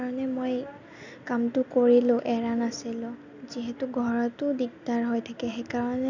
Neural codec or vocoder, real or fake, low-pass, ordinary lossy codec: none; real; 7.2 kHz; none